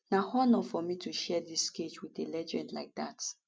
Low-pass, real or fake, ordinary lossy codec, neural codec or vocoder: none; real; none; none